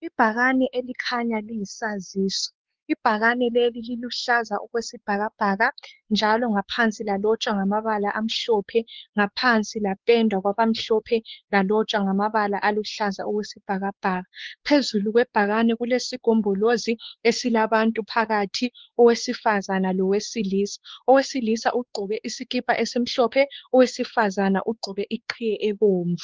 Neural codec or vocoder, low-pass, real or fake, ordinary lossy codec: codec, 16 kHz, 4 kbps, X-Codec, WavLM features, trained on Multilingual LibriSpeech; 7.2 kHz; fake; Opus, 16 kbps